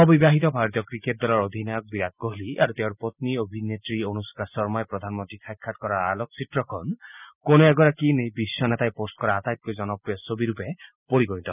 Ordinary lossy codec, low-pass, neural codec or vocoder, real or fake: none; 3.6 kHz; none; real